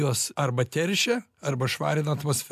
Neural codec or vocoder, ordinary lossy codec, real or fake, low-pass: none; AAC, 96 kbps; real; 14.4 kHz